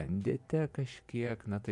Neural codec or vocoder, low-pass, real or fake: vocoder, 24 kHz, 100 mel bands, Vocos; 10.8 kHz; fake